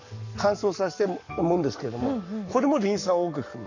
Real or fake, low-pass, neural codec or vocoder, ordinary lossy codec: fake; 7.2 kHz; codec, 44.1 kHz, 7.8 kbps, Pupu-Codec; none